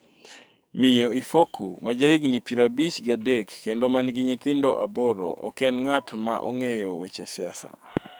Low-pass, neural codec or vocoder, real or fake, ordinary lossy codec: none; codec, 44.1 kHz, 2.6 kbps, SNAC; fake; none